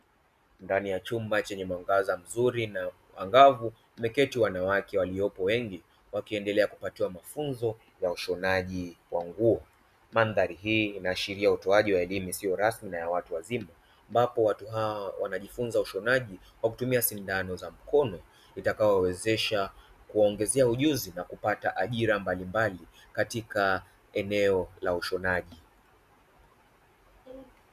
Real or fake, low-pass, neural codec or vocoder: real; 14.4 kHz; none